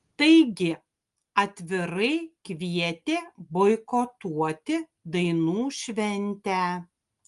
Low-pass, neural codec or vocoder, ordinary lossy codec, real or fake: 10.8 kHz; none; Opus, 32 kbps; real